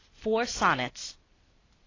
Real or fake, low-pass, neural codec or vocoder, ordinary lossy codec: real; 7.2 kHz; none; AAC, 32 kbps